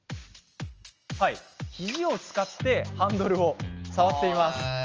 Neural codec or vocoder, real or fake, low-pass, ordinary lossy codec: none; real; 7.2 kHz; Opus, 24 kbps